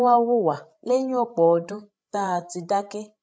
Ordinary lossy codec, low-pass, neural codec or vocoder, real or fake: none; none; codec, 16 kHz, 8 kbps, FreqCodec, larger model; fake